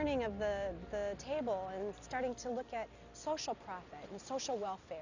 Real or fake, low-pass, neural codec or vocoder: real; 7.2 kHz; none